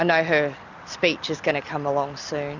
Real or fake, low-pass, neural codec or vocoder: real; 7.2 kHz; none